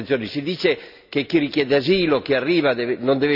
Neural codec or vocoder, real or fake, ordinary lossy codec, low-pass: none; real; none; 5.4 kHz